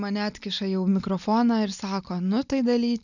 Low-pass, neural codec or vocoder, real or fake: 7.2 kHz; none; real